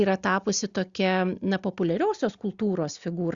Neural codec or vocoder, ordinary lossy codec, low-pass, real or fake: none; Opus, 64 kbps; 7.2 kHz; real